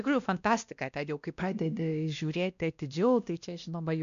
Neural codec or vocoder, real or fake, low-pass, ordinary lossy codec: codec, 16 kHz, 1 kbps, X-Codec, WavLM features, trained on Multilingual LibriSpeech; fake; 7.2 kHz; MP3, 96 kbps